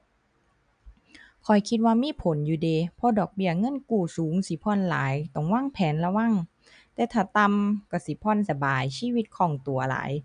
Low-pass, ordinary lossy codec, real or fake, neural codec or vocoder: 9.9 kHz; none; real; none